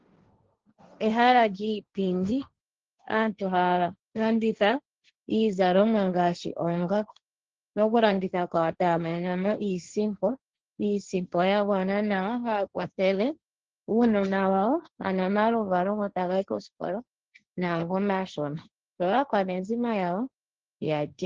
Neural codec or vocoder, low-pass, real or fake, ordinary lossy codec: codec, 16 kHz, 1.1 kbps, Voila-Tokenizer; 7.2 kHz; fake; Opus, 16 kbps